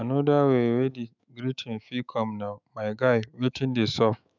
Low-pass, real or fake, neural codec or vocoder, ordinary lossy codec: 7.2 kHz; fake; autoencoder, 48 kHz, 128 numbers a frame, DAC-VAE, trained on Japanese speech; none